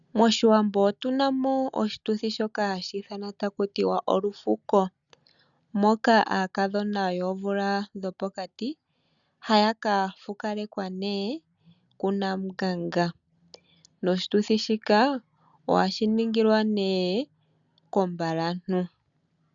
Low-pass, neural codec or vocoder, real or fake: 7.2 kHz; none; real